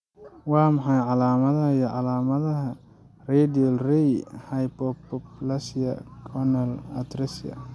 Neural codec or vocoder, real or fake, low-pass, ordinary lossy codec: none; real; none; none